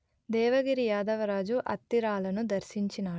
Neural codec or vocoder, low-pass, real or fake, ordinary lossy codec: none; none; real; none